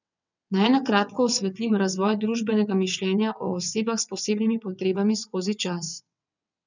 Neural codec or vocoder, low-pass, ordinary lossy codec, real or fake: vocoder, 22.05 kHz, 80 mel bands, WaveNeXt; 7.2 kHz; none; fake